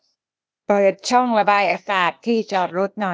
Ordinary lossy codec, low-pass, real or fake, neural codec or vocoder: none; none; fake; codec, 16 kHz, 0.8 kbps, ZipCodec